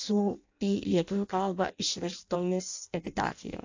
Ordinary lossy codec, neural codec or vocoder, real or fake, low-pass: AAC, 48 kbps; codec, 16 kHz in and 24 kHz out, 0.6 kbps, FireRedTTS-2 codec; fake; 7.2 kHz